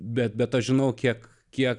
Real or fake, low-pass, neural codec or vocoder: real; 10.8 kHz; none